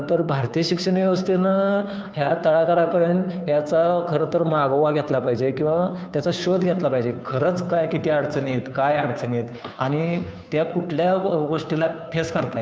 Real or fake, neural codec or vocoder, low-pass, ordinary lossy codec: fake; codec, 16 kHz, 2 kbps, FunCodec, trained on Chinese and English, 25 frames a second; none; none